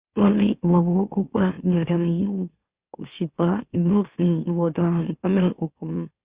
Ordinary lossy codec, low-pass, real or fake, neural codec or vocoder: Opus, 64 kbps; 3.6 kHz; fake; autoencoder, 44.1 kHz, a latent of 192 numbers a frame, MeloTTS